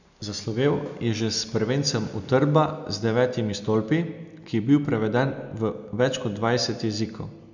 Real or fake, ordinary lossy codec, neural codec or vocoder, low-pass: real; none; none; 7.2 kHz